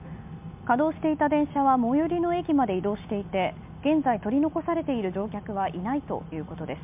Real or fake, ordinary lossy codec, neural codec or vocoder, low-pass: real; MP3, 32 kbps; none; 3.6 kHz